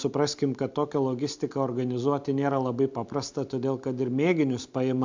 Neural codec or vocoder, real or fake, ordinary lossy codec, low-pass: none; real; MP3, 64 kbps; 7.2 kHz